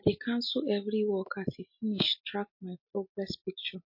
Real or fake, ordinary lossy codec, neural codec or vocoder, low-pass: real; MP3, 32 kbps; none; 5.4 kHz